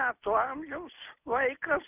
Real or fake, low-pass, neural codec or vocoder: real; 3.6 kHz; none